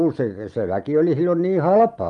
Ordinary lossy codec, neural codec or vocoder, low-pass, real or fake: Opus, 32 kbps; none; 10.8 kHz; real